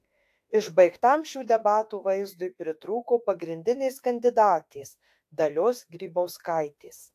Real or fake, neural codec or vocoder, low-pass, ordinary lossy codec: fake; autoencoder, 48 kHz, 32 numbers a frame, DAC-VAE, trained on Japanese speech; 14.4 kHz; AAC, 64 kbps